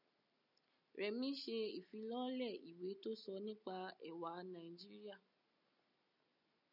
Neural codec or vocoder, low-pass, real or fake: none; 5.4 kHz; real